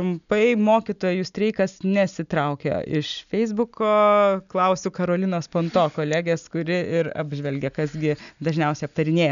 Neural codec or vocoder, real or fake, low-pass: none; real; 7.2 kHz